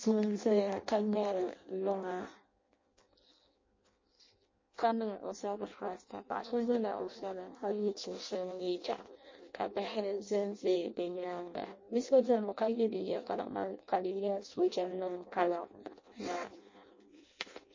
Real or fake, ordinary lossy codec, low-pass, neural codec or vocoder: fake; MP3, 32 kbps; 7.2 kHz; codec, 16 kHz in and 24 kHz out, 0.6 kbps, FireRedTTS-2 codec